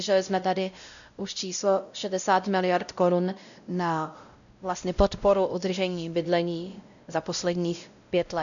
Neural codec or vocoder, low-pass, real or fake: codec, 16 kHz, 0.5 kbps, X-Codec, WavLM features, trained on Multilingual LibriSpeech; 7.2 kHz; fake